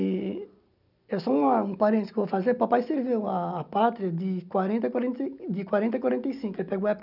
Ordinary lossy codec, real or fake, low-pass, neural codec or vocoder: none; real; 5.4 kHz; none